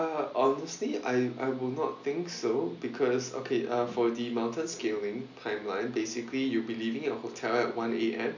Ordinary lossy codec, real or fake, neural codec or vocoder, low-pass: none; real; none; 7.2 kHz